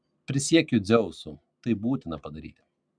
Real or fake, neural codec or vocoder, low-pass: real; none; 9.9 kHz